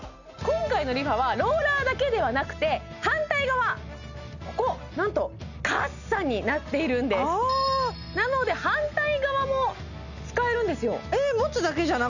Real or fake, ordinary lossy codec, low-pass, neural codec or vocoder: real; none; 7.2 kHz; none